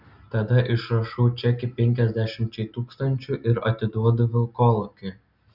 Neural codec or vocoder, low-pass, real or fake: none; 5.4 kHz; real